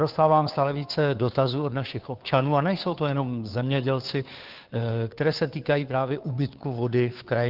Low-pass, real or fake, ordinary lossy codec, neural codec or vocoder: 5.4 kHz; fake; Opus, 32 kbps; codec, 16 kHz, 2 kbps, FunCodec, trained on Chinese and English, 25 frames a second